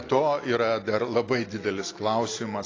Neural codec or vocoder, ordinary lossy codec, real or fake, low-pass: none; AAC, 32 kbps; real; 7.2 kHz